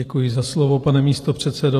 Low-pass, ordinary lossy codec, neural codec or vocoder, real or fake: 14.4 kHz; AAC, 48 kbps; vocoder, 44.1 kHz, 128 mel bands every 512 samples, BigVGAN v2; fake